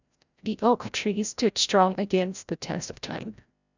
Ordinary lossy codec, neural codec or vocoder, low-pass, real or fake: none; codec, 16 kHz, 0.5 kbps, FreqCodec, larger model; 7.2 kHz; fake